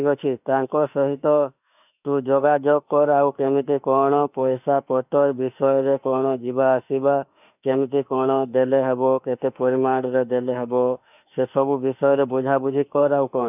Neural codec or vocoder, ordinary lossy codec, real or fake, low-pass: autoencoder, 48 kHz, 32 numbers a frame, DAC-VAE, trained on Japanese speech; none; fake; 3.6 kHz